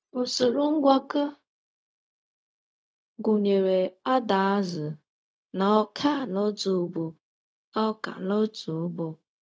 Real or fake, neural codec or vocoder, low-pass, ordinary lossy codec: fake; codec, 16 kHz, 0.4 kbps, LongCat-Audio-Codec; none; none